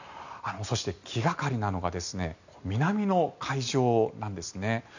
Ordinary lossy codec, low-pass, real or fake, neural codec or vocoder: none; 7.2 kHz; real; none